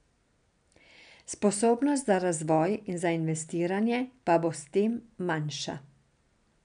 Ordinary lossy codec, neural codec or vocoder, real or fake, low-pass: none; none; real; 9.9 kHz